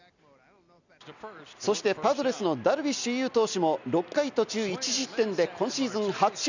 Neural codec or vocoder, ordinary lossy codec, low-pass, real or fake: none; none; 7.2 kHz; real